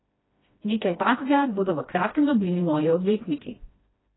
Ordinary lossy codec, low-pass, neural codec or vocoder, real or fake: AAC, 16 kbps; 7.2 kHz; codec, 16 kHz, 1 kbps, FreqCodec, smaller model; fake